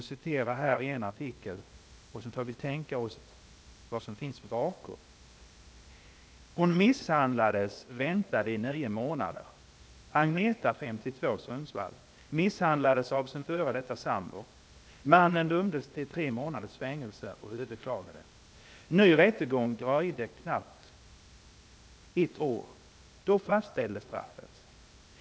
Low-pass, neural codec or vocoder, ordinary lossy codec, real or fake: none; codec, 16 kHz, 0.8 kbps, ZipCodec; none; fake